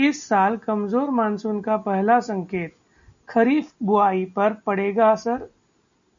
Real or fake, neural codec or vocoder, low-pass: real; none; 7.2 kHz